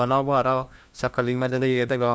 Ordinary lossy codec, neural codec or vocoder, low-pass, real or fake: none; codec, 16 kHz, 1 kbps, FunCodec, trained on LibriTTS, 50 frames a second; none; fake